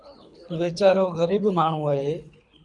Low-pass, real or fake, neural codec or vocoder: 10.8 kHz; fake; codec, 24 kHz, 3 kbps, HILCodec